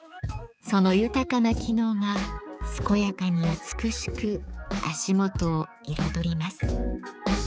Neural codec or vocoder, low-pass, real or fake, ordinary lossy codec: codec, 16 kHz, 4 kbps, X-Codec, HuBERT features, trained on balanced general audio; none; fake; none